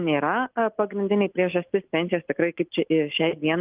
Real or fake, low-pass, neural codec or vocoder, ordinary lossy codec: real; 3.6 kHz; none; Opus, 32 kbps